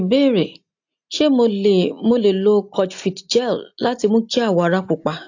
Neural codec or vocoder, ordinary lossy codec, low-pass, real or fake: none; AAC, 48 kbps; 7.2 kHz; real